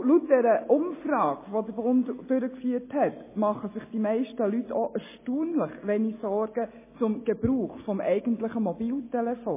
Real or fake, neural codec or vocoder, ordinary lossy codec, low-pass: real; none; MP3, 16 kbps; 3.6 kHz